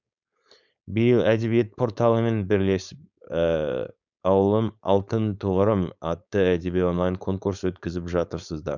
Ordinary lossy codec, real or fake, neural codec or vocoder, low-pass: none; fake; codec, 16 kHz, 4.8 kbps, FACodec; 7.2 kHz